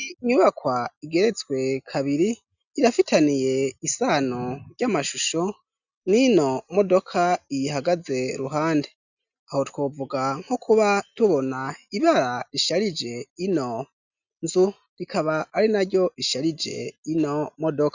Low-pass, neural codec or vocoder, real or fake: 7.2 kHz; none; real